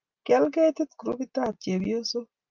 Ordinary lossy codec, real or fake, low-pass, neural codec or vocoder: Opus, 24 kbps; real; 7.2 kHz; none